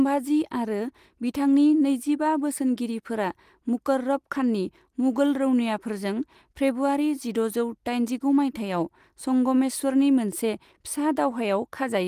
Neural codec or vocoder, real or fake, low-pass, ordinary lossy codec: none; real; 14.4 kHz; Opus, 24 kbps